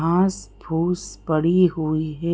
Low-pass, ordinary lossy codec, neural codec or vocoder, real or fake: none; none; none; real